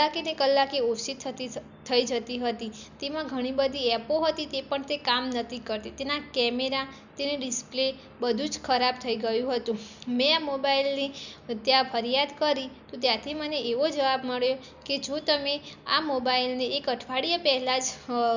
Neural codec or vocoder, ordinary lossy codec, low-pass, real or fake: none; none; 7.2 kHz; real